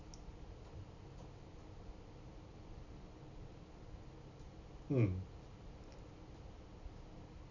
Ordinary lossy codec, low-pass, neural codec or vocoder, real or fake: none; 7.2 kHz; none; real